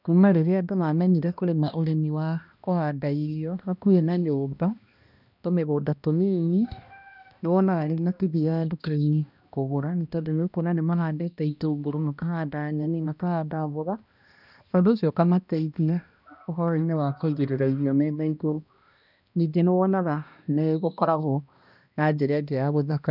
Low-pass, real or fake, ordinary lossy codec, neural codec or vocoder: 5.4 kHz; fake; none; codec, 16 kHz, 1 kbps, X-Codec, HuBERT features, trained on balanced general audio